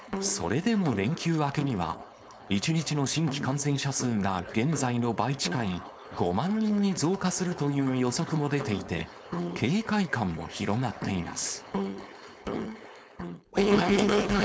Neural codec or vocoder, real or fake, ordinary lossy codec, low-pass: codec, 16 kHz, 4.8 kbps, FACodec; fake; none; none